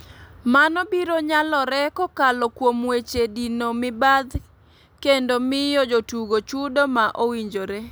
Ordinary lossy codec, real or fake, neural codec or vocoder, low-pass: none; real; none; none